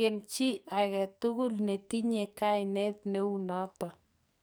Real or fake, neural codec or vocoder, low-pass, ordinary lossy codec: fake; codec, 44.1 kHz, 2.6 kbps, SNAC; none; none